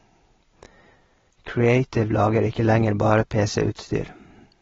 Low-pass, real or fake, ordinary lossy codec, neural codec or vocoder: 7.2 kHz; real; AAC, 24 kbps; none